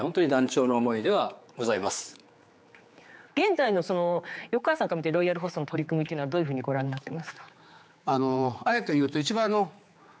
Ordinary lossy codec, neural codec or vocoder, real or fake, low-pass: none; codec, 16 kHz, 4 kbps, X-Codec, HuBERT features, trained on general audio; fake; none